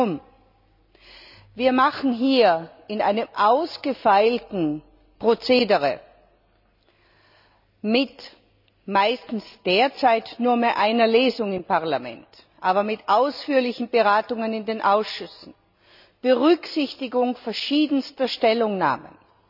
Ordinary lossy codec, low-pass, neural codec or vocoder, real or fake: none; 5.4 kHz; none; real